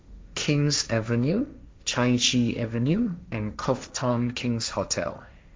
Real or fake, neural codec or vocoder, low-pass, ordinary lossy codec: fake; codec, 16 kHz, 1.1 kbps, Voila-Tokenizer; none; none